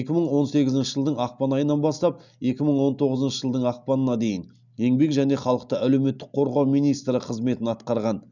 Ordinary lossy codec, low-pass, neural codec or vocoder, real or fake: none; 7.2 kHz; none; real